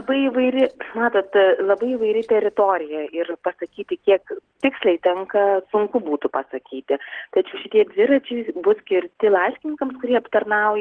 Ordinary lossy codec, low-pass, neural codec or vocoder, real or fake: Opus, 16 kbps; 9.9 kHz; none; real